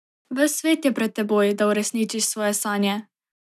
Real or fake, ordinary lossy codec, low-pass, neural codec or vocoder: real; none; 14.4 kHz; none